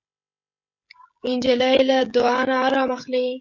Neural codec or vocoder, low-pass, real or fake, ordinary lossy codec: codec, 16 kHz, 16 kbps, FreqCodec, smaller model; 7.2 kHz; fake; MP3, 64 kbps